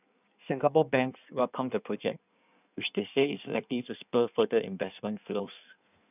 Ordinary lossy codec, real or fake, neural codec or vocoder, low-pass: none; fake; codec, 16 kHz in and 24 kHz out, 1.1 kbps, FireRedTTS-2 codec; 3.6 kHz